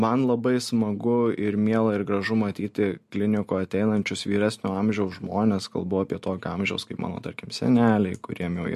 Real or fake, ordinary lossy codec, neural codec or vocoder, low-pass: real; MP3, 64 kbps; none; 14.4 kHz